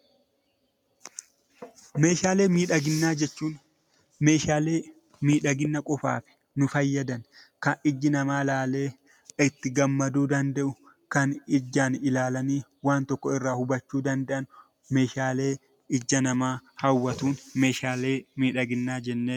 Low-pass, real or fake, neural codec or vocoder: 19.8 kHz; real; none